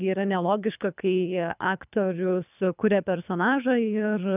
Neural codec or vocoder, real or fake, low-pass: codec, 24 kHz, 3 kbps, HILCodec; fake; 3.6 kHz